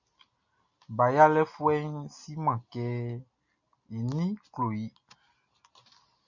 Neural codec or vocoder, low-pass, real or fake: none; 7.2 kHz; real